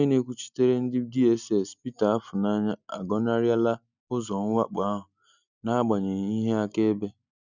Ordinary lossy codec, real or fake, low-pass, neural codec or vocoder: none; real; 7.2 kHz; none